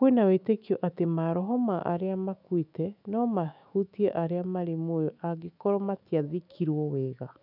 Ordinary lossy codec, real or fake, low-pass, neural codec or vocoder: none; fake; 5.4 kHz; autoencoder, 48 kHz, 128 numbers a frame, DAC-VAE, trained on Japanese speech